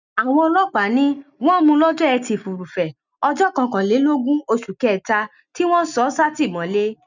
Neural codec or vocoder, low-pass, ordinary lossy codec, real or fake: none; 7.2 kHz; none; real